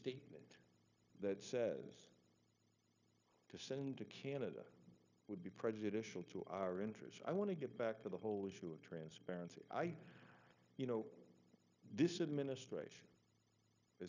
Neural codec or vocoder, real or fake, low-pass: codec, 16 kHz, 0.9 kbps, LongCat-Audio-Codec; fake; 7.2 kHz